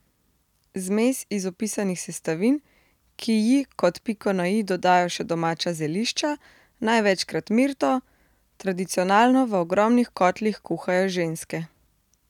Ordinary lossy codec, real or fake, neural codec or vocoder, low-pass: none; real; none; 19.8 kHz